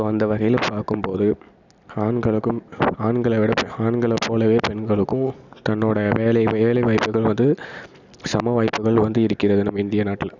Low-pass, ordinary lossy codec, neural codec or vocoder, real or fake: 7.2 kHz; none; none; real